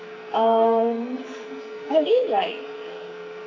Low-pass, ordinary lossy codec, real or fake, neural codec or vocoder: 7.2 kHz; AAC, 48 kbps; fake; codec, 44.1 kHz, 2.6 kbps, SNAC